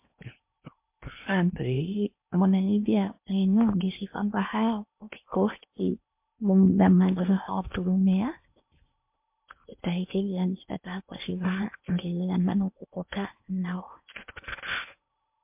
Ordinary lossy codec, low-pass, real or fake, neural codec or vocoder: MP3, 32 kbps; 3.6 kHz; fake; codec, 16 kHz in and 24 kHz out, 0.8 kbps, FocalCodec, streaming, 65536 codes